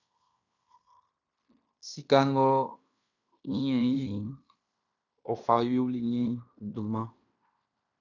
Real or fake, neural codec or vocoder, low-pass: fake; codec, 16 kHz in and 24 kHz out, 0.9 kbps, LongCat-Audio-Codec, fine tuned four codebook decoder; 7.2 kHz